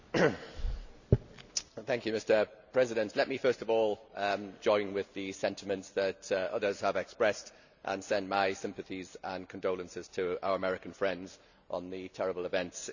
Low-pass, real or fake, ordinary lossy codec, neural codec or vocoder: 7.2 kHz; real; none; none